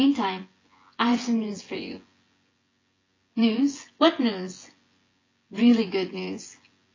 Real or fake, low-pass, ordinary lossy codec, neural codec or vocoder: fake; 7.2 kHz; AAC, 32 kbps; vocoder, 24 kHz, 100 mel bands, Vocos